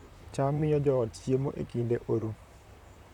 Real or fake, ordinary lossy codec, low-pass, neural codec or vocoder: fake; none; 19.8 kHz; vocoder, 44.1 kHz, 128 mel bands, Pupu-Vocoder